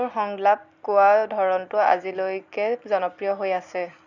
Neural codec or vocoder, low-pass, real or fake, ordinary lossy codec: none; 7.2 kHz; real; none